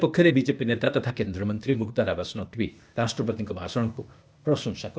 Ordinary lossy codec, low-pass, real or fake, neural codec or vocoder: none; none; fake; codec, 16 kHz, 0.8 kbps, ZipCodec